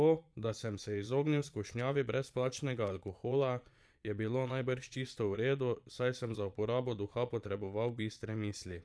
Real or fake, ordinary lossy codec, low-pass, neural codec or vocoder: fake; none; none; vocoder, 22.05 kHz, 80 mel bands, Vocos